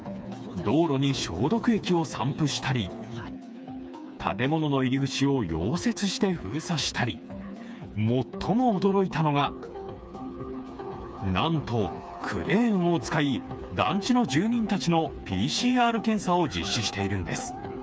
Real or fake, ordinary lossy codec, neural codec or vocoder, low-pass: fake; none; codec, 16 kHz, 4 kbps, FreqCodec, smaller model; none